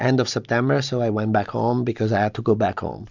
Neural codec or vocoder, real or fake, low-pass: none; real; 7.2 kHz